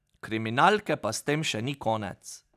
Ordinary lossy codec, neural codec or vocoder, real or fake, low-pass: none; none; real; 14.4 kHz